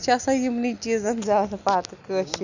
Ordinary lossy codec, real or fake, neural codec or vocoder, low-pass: none; real; none; 7.2 kHz